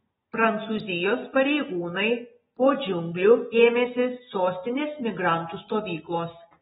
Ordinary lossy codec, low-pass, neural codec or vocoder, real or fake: AAC, 16 kbps; 10.8 kHz; none; real